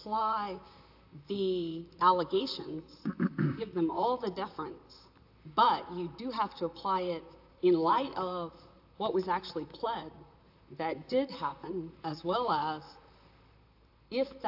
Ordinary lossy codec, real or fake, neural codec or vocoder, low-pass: AAC, 48 kbps; fake; vocoder, 44.1 kHz, 128 mel bands, Pupu-Vocoder; 5.4 kHz